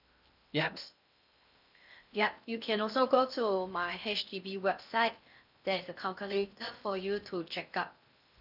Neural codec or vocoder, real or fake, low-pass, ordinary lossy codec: codec, 16 kHz in and 24 kHz out, 0.6 kbps, FocalCodec, streaming, 2048 codes; fake; 5.4 kHz; none